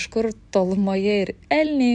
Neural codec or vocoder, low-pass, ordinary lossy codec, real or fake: none; 10.8 kHz; AAC, 64 kbps; real